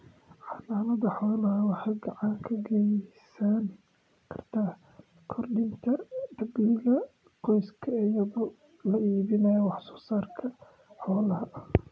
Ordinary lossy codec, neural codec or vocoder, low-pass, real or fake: none; none; none; real